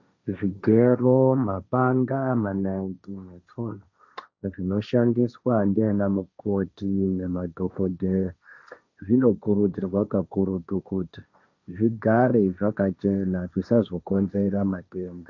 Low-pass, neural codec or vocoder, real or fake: 7.2 kHz; codec, 16 kHz, 1.1 kbps, Voila-Tokenizer; fake